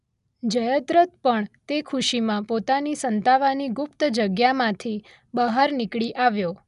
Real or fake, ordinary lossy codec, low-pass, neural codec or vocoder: real; none; 10.8 kHz; none